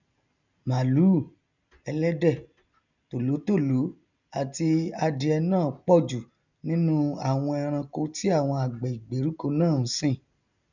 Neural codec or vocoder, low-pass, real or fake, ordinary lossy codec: none; 7.2 kHz; real; none